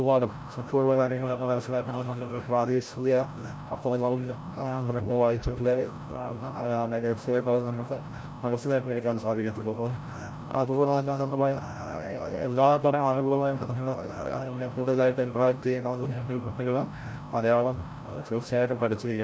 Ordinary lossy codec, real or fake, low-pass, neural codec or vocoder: none; fake; none; codec, 16 kHz, 0.5 kbps, FreqCodec, larger model